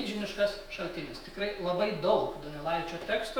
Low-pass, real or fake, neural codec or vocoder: 19.8 kHz; fake; autoencoder, 48 kHz, 128 numbers a frame, DAC-VAE, trained on Japanese speech